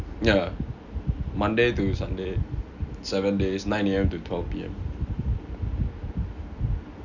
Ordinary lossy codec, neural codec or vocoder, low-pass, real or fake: none; none; 7.2 kHz; real